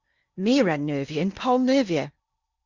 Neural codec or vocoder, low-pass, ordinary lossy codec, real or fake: codec, 16 kHz in and 24 kHz out, 0.8 kbps, FocalCodec, streaming, 65536 codes; 7.2 kHz; Opus, 64 kbps; fake